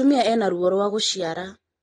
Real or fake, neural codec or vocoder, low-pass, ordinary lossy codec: real; none; 9.9 kHz; AAC, 32 kbps